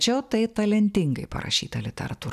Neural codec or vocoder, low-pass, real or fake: none; 14.4 kHz; real